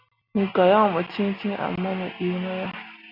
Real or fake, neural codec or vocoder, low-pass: real; none; 5.4 kHz